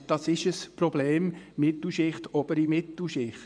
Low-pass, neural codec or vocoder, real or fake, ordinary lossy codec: 9.9 kHz; vocoder, 24 kHz, 100 mel bands, Vocos; fake; none